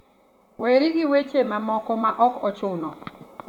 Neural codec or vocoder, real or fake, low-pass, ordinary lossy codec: vocoder, 44.1 kHz, 128 mel bands, Pupu-Vocoder; fake; 19.8 kHz; none